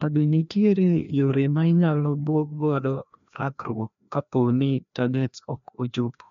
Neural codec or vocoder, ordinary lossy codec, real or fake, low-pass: codec, 16 kHz, 1 kbps, FreqCodec, larger model; MP3, 64 kbps; fake; 7.2 kHz